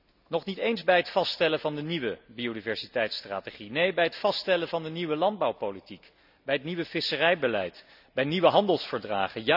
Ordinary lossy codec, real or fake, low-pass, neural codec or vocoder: none; real; 5.4 kHz; none